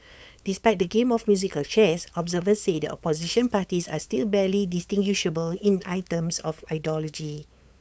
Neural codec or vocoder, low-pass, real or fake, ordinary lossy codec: codec, 16 kHz, 8 kbps, FunCodec, trained on LibriTTS, 25 frames a second; none; fake; none